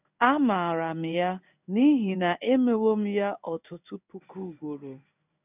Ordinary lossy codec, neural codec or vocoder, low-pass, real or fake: none; codec, 16 kHz in and 24 kHz out, 1 kbps, XY-Tokenizer; 3.6 kHz; fake